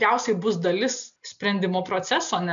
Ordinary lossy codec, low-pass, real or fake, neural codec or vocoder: MP3, 96 kbps; 7.2 kHz; real; none